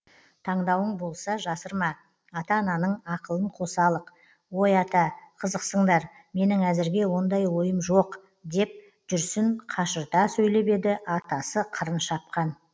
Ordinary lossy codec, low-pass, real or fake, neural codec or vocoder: none; none; real; none